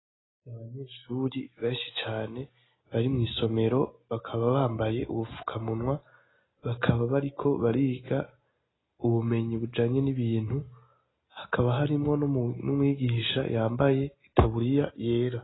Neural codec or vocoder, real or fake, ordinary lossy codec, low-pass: none; real; AAC, 16 kbps; 7.2 kHz